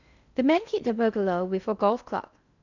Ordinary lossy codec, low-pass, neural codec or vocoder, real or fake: none; 7.2 kHz; codec, 16 kHz in and 24 kHz out, 0.6 kbps, FocalCodec, streaming, 2048 codes; fake